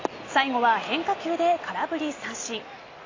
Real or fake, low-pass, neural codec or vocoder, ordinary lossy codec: fake; 7.2 kHz; vocoder, 44.1 kHz, 128 mel bands every 256 samples, BigVGAN v2; AAC, 32 kbps